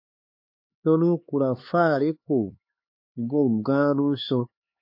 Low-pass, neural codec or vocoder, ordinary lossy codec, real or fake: 5.4 kHz; codec, 16 kHz, 4 kbps, X-Codec, HuBERT features, trained on LibriSpeech; MP3, 32 kbps; fake